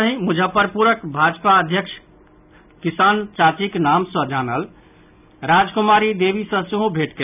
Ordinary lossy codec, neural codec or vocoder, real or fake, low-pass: none; none; real; 3.6 kHz